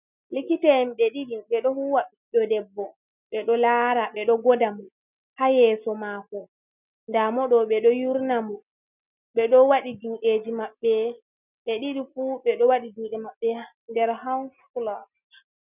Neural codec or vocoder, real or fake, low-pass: none; real; 3.6 kHz